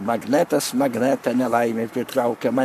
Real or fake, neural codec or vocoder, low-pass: fake; codec, 44.1 kHz, 7.8 kbps, Pupu-Codec; 14.4 kHz